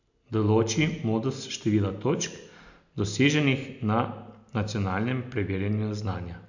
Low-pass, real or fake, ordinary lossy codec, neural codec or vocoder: 7.2 kHz; real; none; none